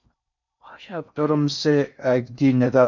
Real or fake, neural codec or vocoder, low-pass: fake; codec, 16 kHz in and 24 kHz out, 0.6 kbps, FocalCodec, streaming, 4096 codes; 7.2 kHz